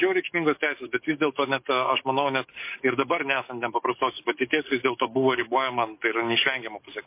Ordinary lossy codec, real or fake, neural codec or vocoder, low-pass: MP3, 24 kbps; fake; codec, 44.1 kHz, 7.8 kbps, DAC; 3.6 kHz